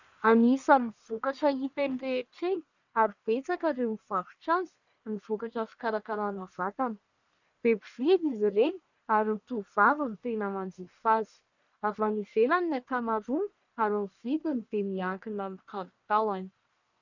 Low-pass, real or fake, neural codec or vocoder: 7.2 kHz; fake; codec, 44.1 kHz, 1.7 kbps, Pupu-Codec